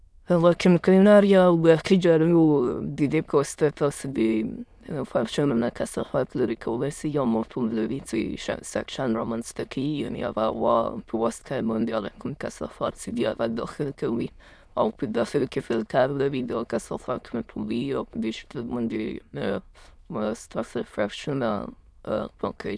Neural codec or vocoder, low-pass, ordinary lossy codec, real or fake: autoencoder, 22.05 kHz, a latent of 192 numbers a frame, VITS, trained on many speakers; none; none; fake